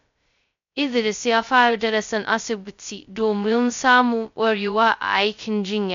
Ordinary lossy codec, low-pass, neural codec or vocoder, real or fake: MP3, 64 kbps; 7.2 kHz; codec, 16 kHz, 0.2 kbps, FocalCodec; fake